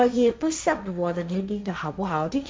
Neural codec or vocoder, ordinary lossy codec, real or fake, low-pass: codec, 16 kHz, 1.1 kbps, Voila-Tokenizer; none; fake; none